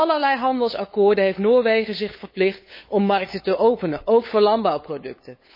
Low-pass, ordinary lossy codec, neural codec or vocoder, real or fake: 5.4 kHz; MP3, 24 kbps; codec, 16 kHz, 8 kbps, FunCodec, trained on LibriTTS, 25 frames a second; fake